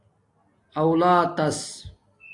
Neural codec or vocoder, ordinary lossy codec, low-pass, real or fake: none; AAC, 48 kbps; 10.8 kHz; real